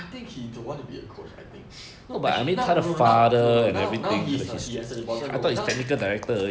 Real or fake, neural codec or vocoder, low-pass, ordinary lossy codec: real; none; none; none